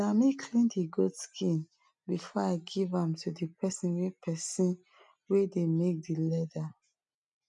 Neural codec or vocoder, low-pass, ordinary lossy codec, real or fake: none; 10.8 kHz; none; real